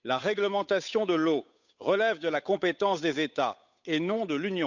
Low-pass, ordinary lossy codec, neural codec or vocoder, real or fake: 7.2 kHz; none; codec, 16 kHz, 8 kbps, FunCodec, trained on Chinese and English, 25 frames a second; fake